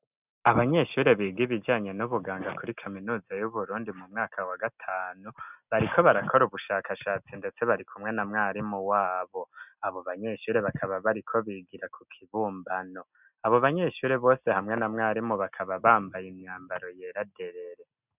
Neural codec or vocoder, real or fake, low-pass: none; real; 3.6 kHz